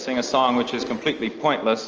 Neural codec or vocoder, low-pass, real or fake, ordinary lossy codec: none; 7.2 kHz; real; Opus, 24 kbps